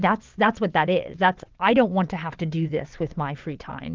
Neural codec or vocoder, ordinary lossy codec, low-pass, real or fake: vocoder, 22.05 kHz, 80 mel bands, WaveNeXt; Opus, 32 kbps; 7.2 kHz; fake